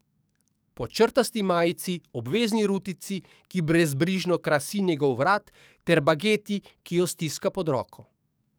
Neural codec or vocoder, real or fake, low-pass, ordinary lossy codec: codec, 44.1 kHz, 7.8 kbps, DAC; fake; none; none